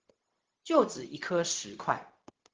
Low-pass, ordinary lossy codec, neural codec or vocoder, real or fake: 7.2 kHz; Opus, 16 kbps; codec, 16 kHz, 0.4 kbps, LongCat-Audio-Codec; fake